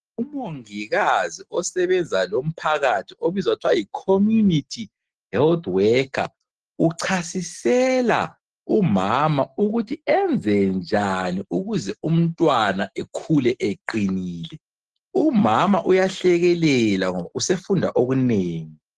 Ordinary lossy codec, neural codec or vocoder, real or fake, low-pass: Opus, 16 kbps; none; real; 10.8 kHz